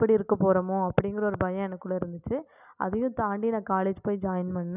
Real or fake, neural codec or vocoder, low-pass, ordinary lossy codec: real; none; 3.6 kHz; none